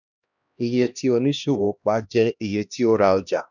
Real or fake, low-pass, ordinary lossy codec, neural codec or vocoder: fake; 7.2 kHz; none; codec, 16 kHz, 1 kbps, X-Codec, HuBERT features, trained on LibriSpeech